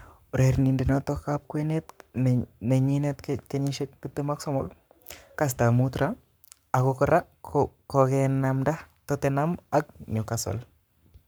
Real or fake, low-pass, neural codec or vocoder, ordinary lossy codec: fake; none; codec, 44.1 kHz, 7.8 kbps, Pupu-Codec; none